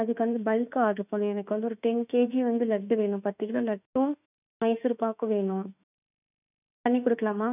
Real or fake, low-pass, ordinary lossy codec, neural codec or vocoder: fake; 3.6 kHz; none; autoencoder, 48 kHz, 32 numbers a frame, DAC-VAE, trained on Japanese speech